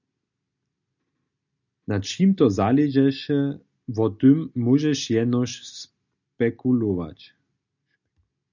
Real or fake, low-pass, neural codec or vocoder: real; 7.2 kHz; none